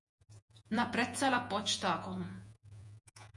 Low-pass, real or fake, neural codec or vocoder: 10.8 kHz; fake; vocoder, 48 kHz, 128 mel bands, Vocos